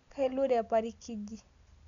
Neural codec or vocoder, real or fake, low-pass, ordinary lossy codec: none; real; 7.2 kHz; none